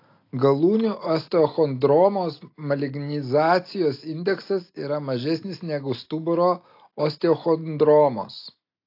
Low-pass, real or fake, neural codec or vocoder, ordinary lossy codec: 5.4 kHz; real; none; AAC, 32 kbps